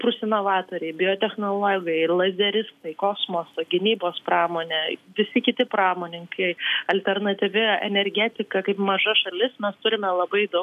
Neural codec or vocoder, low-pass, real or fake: none; 14.4 kHz; real